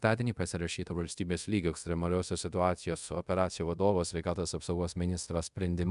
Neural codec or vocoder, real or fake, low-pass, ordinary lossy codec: codec, 24 kHz, 0.5 kbps, DualCodec; fake; 10.8 kHz; MP3, 96 kbps